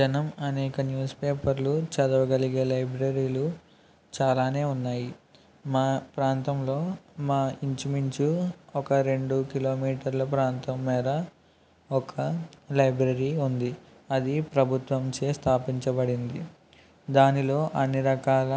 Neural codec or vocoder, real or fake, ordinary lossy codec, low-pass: none; real; none; none